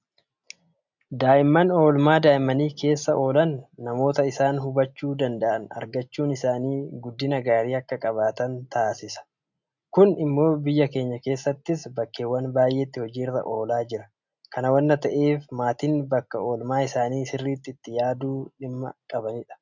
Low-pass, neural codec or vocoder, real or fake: 7.2 kHz; none; real